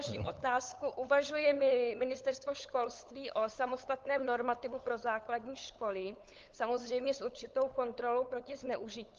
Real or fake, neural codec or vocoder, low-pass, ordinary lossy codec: fake; codec, 16 kHz, 8 kbps, FunCodec, trained on LibriTTS, 25 frames a second; 7.2 kHz; Opus, 32 kbps